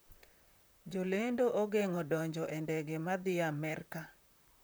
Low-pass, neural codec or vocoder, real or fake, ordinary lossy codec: none; vocoder, 44.1 kHz, 128 mel bands, Pupu-Vocoder; fake; none